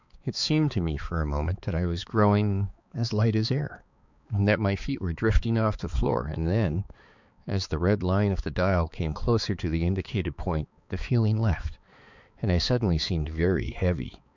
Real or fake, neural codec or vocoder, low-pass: fake; codec, 16 kHz, 4 kbps, X-Codec, HuBERT features, trained on balanced general audio; 7.2 kHz